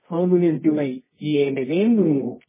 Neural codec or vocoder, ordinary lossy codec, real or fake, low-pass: codec, 24 kHz, 0.9 kbps, WavTokenizer, medium music audio release; MP3, 16 kbps; fake; 3.6 kHz